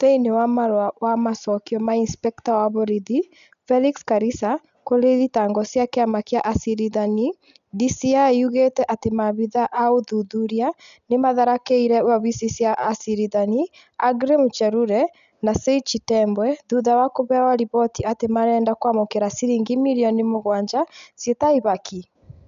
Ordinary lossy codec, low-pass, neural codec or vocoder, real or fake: none; 7.2 kHz; none; real